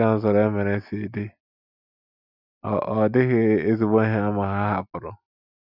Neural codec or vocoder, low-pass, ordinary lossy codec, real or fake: none; 5.4 kHz; none; real